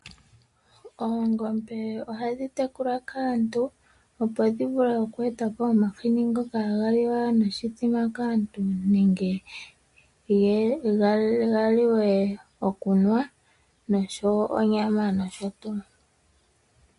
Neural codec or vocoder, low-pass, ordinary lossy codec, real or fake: none; 10.8 kHz; MP3, 48 kbps; real